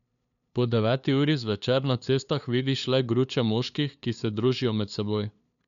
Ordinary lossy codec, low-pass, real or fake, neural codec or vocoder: MP3, 96 kbps; 7.2 kHz; fake; codec, 16 kHz, 2 kbps, FunCodec, trained on LibriTTS, 25 frames a second